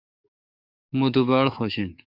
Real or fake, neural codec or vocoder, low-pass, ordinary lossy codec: fake; codec, 44.1 kHz, 7.8 kbps, DAC; 5.4 kHz; Opus, 64 kbps